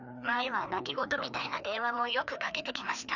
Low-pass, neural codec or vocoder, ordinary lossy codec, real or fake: 7.2 kHz; codec, 16 kHz, 2 kbps, FreqCodec, larger model; none; fake